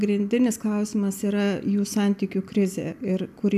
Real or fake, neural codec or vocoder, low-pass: real; none; 14.4 kHz